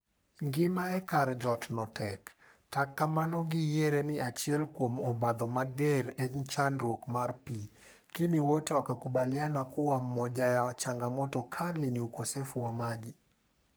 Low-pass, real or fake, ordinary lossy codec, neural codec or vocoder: none; fake; none; codec, 44.1 kHz, 3.4 kbps, Pupu-Codec